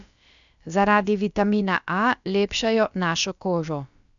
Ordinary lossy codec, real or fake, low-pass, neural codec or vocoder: none; fake; 7.2 kHz; codec, 16 kHz, about 1 kbps, DyCAST, with the encoder's durations